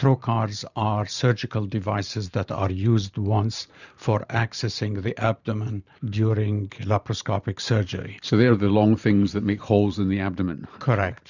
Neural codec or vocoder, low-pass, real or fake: vocoder, 44.1 kHz, 128 mel bands every 512 samples, BigVGAN v2; 7.2 kHz; fake